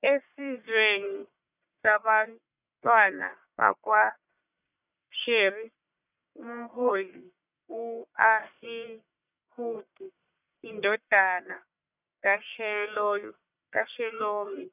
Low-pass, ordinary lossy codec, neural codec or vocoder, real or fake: 3.6 kHz; none; codec, 44.1 kHz, 1.7 kbps, Pupu-Codec; fake